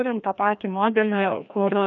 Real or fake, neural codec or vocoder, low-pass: fake; codec, 16 kHz, 1 kbps, FreqCodec, larger model; 7.2 kHz